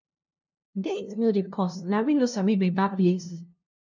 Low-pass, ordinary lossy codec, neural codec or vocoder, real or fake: 7.2 kHz; none; codec, 16 kHz, 0.5 kbps, FunCodec, trained on LibriTTS, 25 frames a second; fake